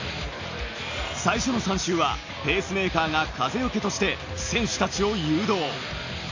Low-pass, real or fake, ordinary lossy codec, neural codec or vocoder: 7.2 kHz; real; AAC, 48 kbps; none